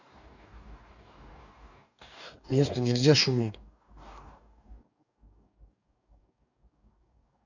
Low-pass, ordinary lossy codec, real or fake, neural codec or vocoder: 7.2 kHz; none; fake; codec, 44.1 kHz, 2.6 kbps, DAC